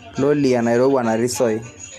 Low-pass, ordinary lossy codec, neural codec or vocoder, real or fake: 14.4 kHz; none; none; real